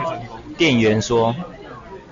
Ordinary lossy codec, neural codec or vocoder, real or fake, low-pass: MP3, 48 kbps; none; real; 7.2 kHz